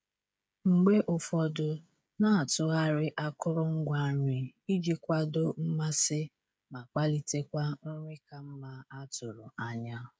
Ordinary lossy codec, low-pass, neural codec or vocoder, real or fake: none; none; codec, 16 kHz, 16 kbps, FreqCodec, smaller model; fake